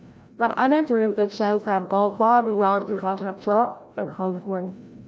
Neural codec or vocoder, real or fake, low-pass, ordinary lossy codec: codec, 16 kHz, 0.5 kbps, FreqCodec, larger model; fake; none; none